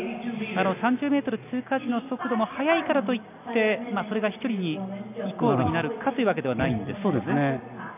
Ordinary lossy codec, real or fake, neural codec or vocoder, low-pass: none; real; none; 3.6 kHz